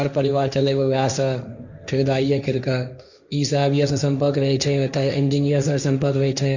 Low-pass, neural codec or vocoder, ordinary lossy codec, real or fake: 7.2 kHz; codec, 16 kHz, 1.1 kbps, Voila-Tokenizer; none; fake